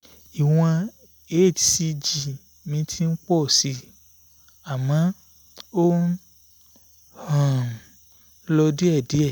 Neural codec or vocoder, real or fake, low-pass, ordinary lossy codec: none; real; none; none